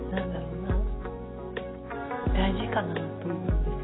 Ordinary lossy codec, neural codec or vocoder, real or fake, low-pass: AAC, 16 kbps; none; real; 7.2 kHz